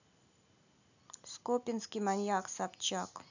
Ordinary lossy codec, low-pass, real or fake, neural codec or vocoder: none; 7.2 kHz; real; none